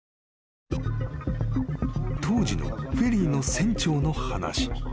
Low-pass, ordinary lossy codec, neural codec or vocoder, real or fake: none; none; none; real